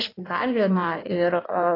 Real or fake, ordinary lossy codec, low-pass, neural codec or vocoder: fake; AAC, 24 kbps; 5.4 kHz; codec, 16 kHz in and 24 kHz out, 1.1 kbps, FireRedTTS-2 codec